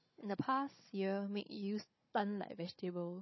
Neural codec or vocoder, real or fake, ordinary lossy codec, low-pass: codec, 16 kHz, 16 kbps, FunCodec, trained on Chinese and English, 50 frames a second; fake; MP3, 24 kbps; 7.2 kHz